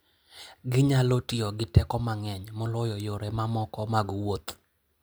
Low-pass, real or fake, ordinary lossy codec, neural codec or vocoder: none; real; none; none